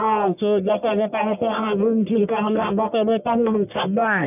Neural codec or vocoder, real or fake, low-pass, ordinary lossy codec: codec, 44.1 kHz, 1.7 kbps, Pupu-Codec; fake; 3.6 kHz; none